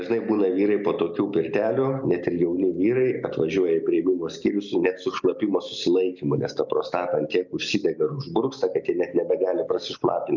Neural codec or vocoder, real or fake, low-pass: none; real; 7.2 kHz